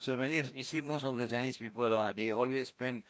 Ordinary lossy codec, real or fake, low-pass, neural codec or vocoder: none; fake; none; codec, 16 kHz, 1 kbps, FreqCodec, larger model